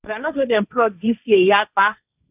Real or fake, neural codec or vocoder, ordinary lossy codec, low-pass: fake; codec, 16 kHz, 1.1 kbps, Voila-Tokenizer; none; 3.6 kHz